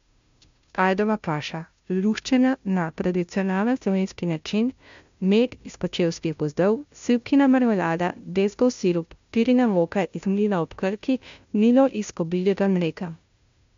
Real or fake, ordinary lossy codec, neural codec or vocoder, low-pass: fake; MP3, 64 kbps; codec, 16 kHz, 0.5 kbps, FunCodec, trained on Chinese and English, 25 frames a second; 7.2 kHz